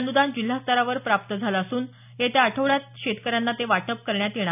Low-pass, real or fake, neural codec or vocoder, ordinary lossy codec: 3.6 kHz; real; none; none